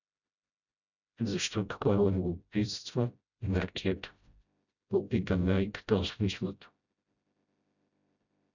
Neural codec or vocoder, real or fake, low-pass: codec, 16 kHz, 0.5 kbps, FreqCodec, smaller model; fake; 7.2 kHz